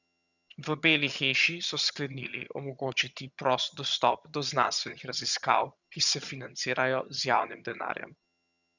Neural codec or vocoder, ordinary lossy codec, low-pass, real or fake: vocoder, 22.05 kHz, 80 mel bands, HiFi-GAN; none; 7.2 kHz; fake